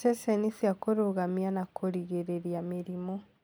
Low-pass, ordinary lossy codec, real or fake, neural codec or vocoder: none; none; real; none